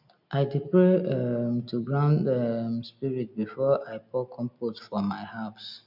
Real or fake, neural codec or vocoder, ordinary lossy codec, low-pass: real; none; none; 5.4 kHz